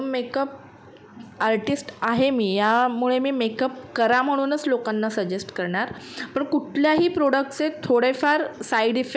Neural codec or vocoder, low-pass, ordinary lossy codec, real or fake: none; none; none; real